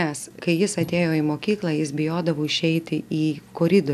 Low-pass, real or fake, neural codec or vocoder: 14.4 kHz; real; none